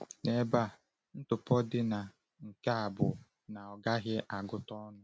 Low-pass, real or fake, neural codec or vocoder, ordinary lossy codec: none; real; none; none